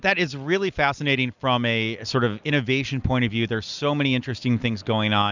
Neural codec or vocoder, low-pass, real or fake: none; 7.2 kHz; real